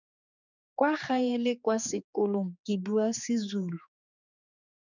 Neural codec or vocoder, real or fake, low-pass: codec, 16 kHz, 4 kbps, X-Codec, HuBERT features, trained on general audio; fake; 7.2 kHz